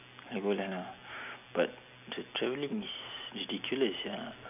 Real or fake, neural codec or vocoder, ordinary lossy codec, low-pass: real; none; none; 3.6 kHz